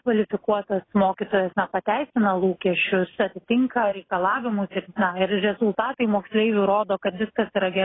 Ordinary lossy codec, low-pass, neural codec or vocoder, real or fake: AAC, 16 kbps; 7.2 kHz; none; real